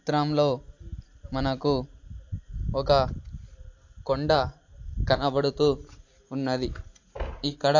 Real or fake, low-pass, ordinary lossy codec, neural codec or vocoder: real; 7.2 kHz; AAC, 48 kbps; none